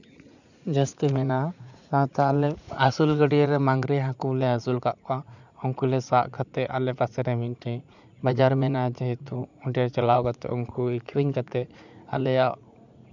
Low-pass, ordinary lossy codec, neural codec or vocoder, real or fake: 7.2 kHz; none; vocoder, 44.1 kHz, 80 mel bands, Vocos; fake